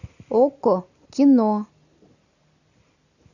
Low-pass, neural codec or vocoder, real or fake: 7.2 kHz; none; real